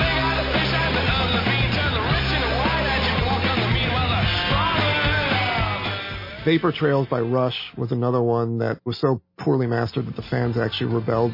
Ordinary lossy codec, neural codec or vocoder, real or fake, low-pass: MP3, 24 kbps; none; real; 5.4 kHz